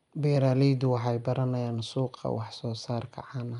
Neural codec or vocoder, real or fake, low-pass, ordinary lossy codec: none; real; 10.8 kHz; none